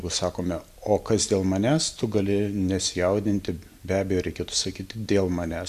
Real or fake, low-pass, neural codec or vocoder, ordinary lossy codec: real; 14.4 kHz; none; Opus, 64 kbps